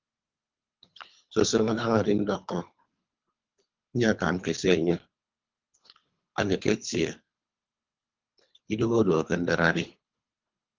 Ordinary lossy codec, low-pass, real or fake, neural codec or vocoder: Opus, 24 kbps; 7.2 kHz; fake; codec, 24 kHz, 3 kbps, HILCodec